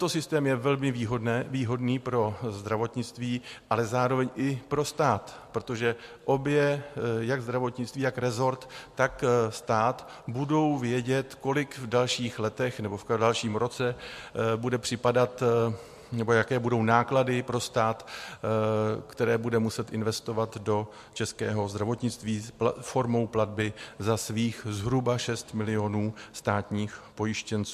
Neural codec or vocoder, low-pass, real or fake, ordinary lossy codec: none; 14.4 kHz; real; MP3, 64 kbps